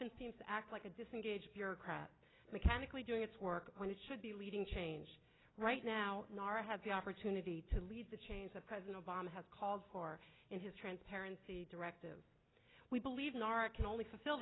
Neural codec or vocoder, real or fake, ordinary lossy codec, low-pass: none; real; AAC, 16 kbps; 7.2 kHz